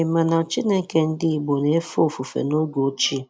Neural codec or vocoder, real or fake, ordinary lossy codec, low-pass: none; real; none; none